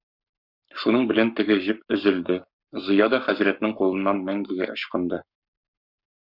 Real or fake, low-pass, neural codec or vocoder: fake; 5.4 kHz; codec, 44.1 kHz, 7.8 kbps, Pupu-Codec